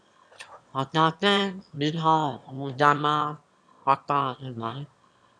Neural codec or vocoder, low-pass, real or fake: autoencoder, 22.05 kHz, a latent of 192 numbers a frame, VITS, trained on one speaker; 9.9 kHz; fake